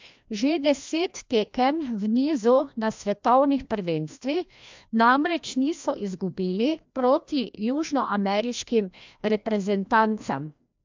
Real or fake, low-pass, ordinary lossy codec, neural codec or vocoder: fake; 7.2 kHz; MP3, 64 kbps; codec, 16 kHz, 1 kbps, FreqCodec, larger model